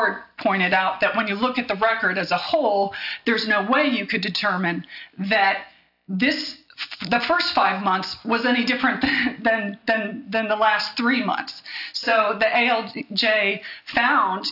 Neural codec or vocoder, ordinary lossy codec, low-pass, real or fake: none; AAC, 48 kbps; 5.4 kHz; real